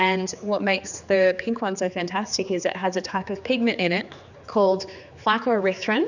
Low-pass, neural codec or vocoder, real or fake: 7.2 kHz; codec, 16 kHz, 4 kbps, X-Codec, HuBERT features, trained on general audio; fake